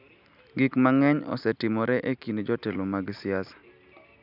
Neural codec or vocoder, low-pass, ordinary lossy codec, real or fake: none; 5.4 kHz; none; real